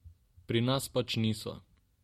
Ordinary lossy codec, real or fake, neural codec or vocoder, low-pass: MP3, 64 kbps; real; none; 19.8 kHz